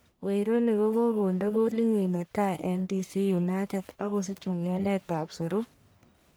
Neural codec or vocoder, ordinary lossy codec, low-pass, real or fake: codec, 44.1 kHz, 1.7 kbps, Pupu-Codec; none; none; fake